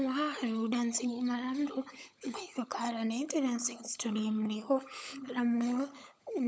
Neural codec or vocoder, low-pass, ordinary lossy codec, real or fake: codec, 16 kHz, 16 kbps, FunCodec, trained on LibriTTS, 50 frames a second; none; none; fake